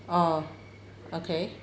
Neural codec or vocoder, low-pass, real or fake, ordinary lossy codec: none; none; real; none